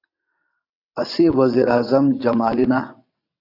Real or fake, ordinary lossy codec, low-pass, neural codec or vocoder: fake; AAC, 32 kbps; 5.4 kHz; vocoder, 22.05 kHz, 80 mel bands, WaveNeXt